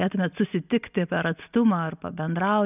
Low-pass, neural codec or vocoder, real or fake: 3.6 kHz; none; real